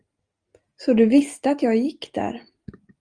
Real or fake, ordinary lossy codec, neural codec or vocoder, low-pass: real; Opus, 32 kbps; none; 9.9 kHz